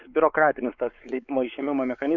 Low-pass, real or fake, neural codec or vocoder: 7.2 kHz; fake; codec, 16 kHz, 4 kbps, X-Codec, WavLM features, trained on Multilingual LibriSpeech